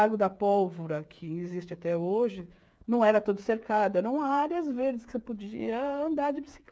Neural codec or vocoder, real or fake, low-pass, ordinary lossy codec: codec, 16 kHz, 8 kbps, FreqCodec, smaller model; fake; none; none